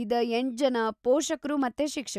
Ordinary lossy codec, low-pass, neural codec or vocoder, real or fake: none; 14.4 kHz; none; real